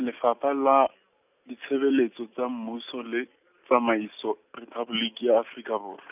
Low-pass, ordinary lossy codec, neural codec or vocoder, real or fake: 3.6 kHz; none; none; real